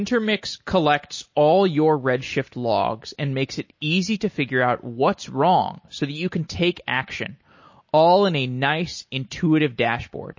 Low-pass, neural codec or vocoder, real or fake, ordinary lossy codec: 7.2 kHz; none; real; MP3, 32 kbps